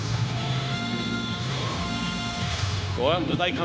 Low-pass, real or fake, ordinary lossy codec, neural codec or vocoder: none; fake; none; codec, 16 kHz, 0.9 kbps, LongCat-Audio-Codec